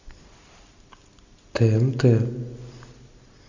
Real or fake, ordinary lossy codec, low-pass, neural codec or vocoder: real; Opus, 64 kbps; 7.2 kHz; none